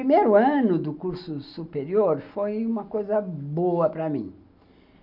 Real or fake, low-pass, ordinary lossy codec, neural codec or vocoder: real; 5.4 kHz; none; none